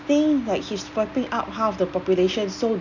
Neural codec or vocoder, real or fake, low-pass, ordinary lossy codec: none; real; 7.2 kHz; none